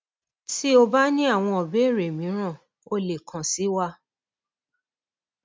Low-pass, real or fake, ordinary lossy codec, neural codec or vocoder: none; real; none; none